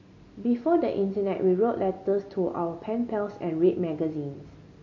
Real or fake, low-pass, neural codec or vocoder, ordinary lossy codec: real; 7.2 kHz; none; MP3, 32 kbps